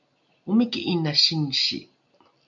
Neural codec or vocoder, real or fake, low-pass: none; real; 7.2 kHz